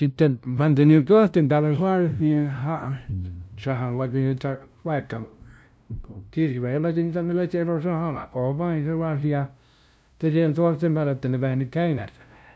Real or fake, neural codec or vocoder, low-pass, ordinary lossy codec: fake; codec, 16 kHz, 0.5 kbps, FunCodec, trained on LibriTTS, 25 frames a second; none; none